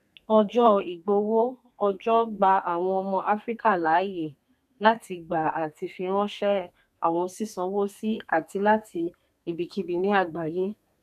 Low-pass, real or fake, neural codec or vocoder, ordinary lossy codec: 14.4 kHz; fake; codec, 32 kHz, 1.9 kbps, SNAC; none